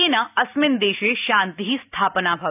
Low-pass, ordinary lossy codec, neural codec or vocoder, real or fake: 3.6 kHz; none; none; real